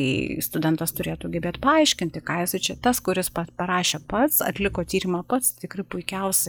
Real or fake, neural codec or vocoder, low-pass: real; none; 19.8 kHz